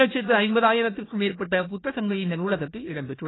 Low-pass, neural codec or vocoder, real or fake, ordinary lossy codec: 7.2 kHz; codec, 16 kHz, 1 kbps, FunCodec, trained on Chinese and English, 50 frames a second; fake; AAC, 16 kbps